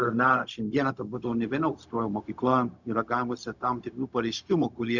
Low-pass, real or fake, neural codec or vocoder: 7.2 kHz; fake; codec, 16 kHz, 0.4 kbps, LongCat-Audio-Codec